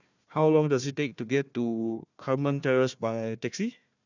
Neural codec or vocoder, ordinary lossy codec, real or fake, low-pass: codec, 16 kHz, 1 kbps, FunCodec, trained on Chinese and English, 50 frames a second; none; fake; 7.2 kHz